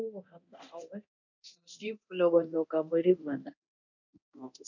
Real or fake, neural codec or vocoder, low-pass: fake; codec, 24 kHz, 0.9 kbps, DualCodec; 7.2 kHz